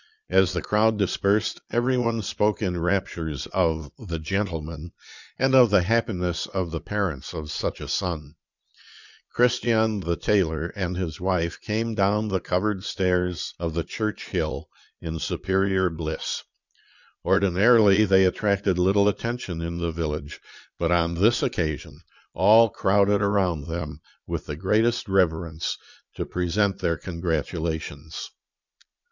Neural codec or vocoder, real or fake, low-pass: vocoder, 22.05 kHz, 80 mel bands, Vocos; fake; 7.2 kHz